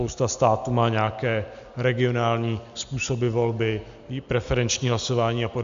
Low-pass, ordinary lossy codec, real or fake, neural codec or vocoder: 7.2 kHz; MP3, 64 kbps; real; none